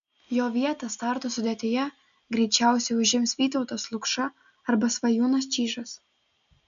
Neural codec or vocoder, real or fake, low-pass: none; real; 7.2 kHz